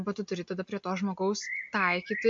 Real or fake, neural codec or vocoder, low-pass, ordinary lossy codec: real; none; 7.2 kHz; MP3, 48 kbps